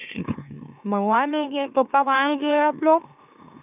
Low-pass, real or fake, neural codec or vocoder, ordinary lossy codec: 3.6 kHz; fake; autoencoder, 44.1 kHz, a latent of 192 numbers a frame, MeloTTS; none